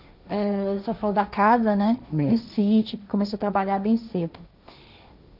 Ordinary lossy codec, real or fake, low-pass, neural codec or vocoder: none; fake; 5.4 kHz; codec, 16 kHz, 1.1 kbps, Voila-Tokenizer